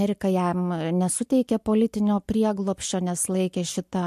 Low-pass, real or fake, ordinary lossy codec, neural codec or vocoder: 14.4 kHz; real; MP3, 64 kbps; none